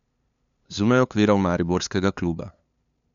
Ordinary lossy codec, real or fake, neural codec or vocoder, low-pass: none; fake; codec, 16 kHz, 2 kbps, FunCodec, trained on LibriTTS, 25 frames a second; 7.2 kHz